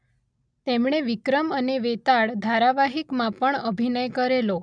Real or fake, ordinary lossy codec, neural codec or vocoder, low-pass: real; none; none; 9.9 kHz